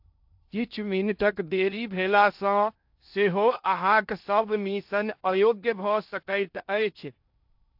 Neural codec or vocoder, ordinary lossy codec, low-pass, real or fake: codec, 16 kHz in and 24 kHz out, 0.6 kbps, FocalCodec, streaming, 2048 codes; AAC, 48 kbps; 5.4 kHz; fake